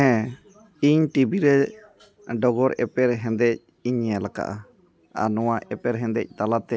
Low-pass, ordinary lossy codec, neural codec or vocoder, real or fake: none; none; none; real